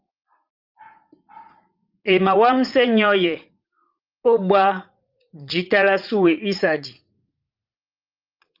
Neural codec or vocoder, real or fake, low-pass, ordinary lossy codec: vocoder, 44.1 kHz, 80 mel bands, Vocos; fake; 5.4 kHz; Opus, 24 kbps